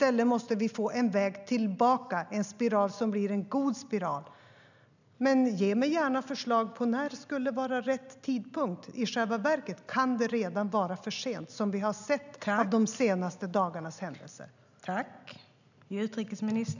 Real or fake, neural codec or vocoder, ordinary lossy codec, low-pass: real; none; none; 7.2 kHz